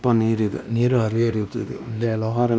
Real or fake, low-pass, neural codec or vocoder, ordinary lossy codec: fake; none; codec, 16 kHz, 1 kbps, X-Codec, WavLM features, trained on Multilingual LibriSpeech; none